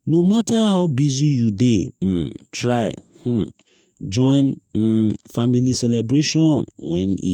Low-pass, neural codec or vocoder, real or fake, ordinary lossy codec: 19.8 kHz; codec, 44.1 kHz, 2.6 kbps, DAC; fake; none